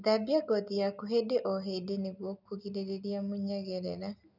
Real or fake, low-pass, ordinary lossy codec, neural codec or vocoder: real; 5.4 kHz; none; none